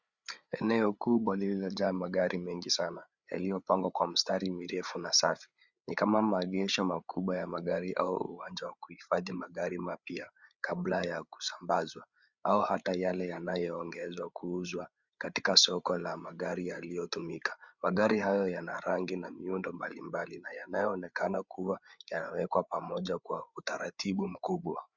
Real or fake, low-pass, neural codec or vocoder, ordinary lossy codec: fake; 7.2 kHz; codec, 16 kHz, 8 kbps, FreqCodec, larger model; Opus, 64 kbps